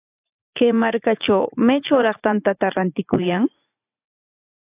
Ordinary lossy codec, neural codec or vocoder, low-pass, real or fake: AAC, 24 kbps; vocoder, 44.1 kHz, 80 mel bands, Vocos; 3.6 kHz; fake